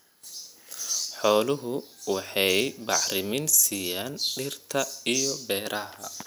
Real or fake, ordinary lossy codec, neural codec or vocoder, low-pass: real; none; none; none